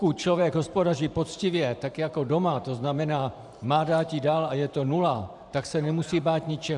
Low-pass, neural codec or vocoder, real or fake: 10.8 kHz; vocoder, 44.1 kHz, 128 mel bands every 512 samples, BigVGAN v2; fake